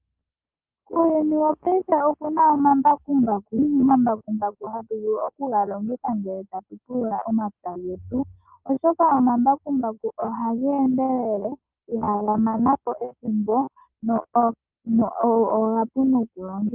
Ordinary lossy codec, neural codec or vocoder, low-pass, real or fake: Opus, 32 kbps; none; 3.6 kHz; real